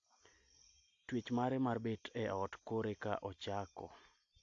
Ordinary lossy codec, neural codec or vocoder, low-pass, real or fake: AAC, 48 kbps; none; 7.2 kHz; real